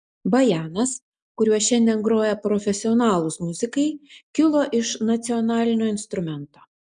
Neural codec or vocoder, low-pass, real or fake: none; 9.9 kHz; real